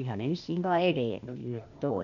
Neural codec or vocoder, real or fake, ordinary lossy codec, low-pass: codec, 16 kHz, 0.8 kbps, ZipCodec; fake; none; 7.2 kHz